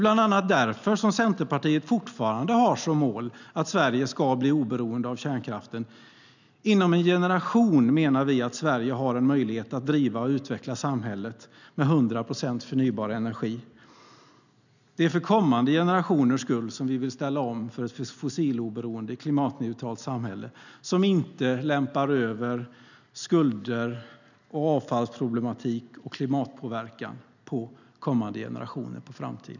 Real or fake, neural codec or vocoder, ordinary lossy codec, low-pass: real; none; none; 7.2 kHz